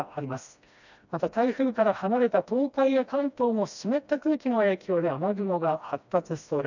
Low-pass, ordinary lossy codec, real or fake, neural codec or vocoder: 7.2 kHz; none; fake; codec, 16 kHz, 1 kbps, FreqCodec, smaller model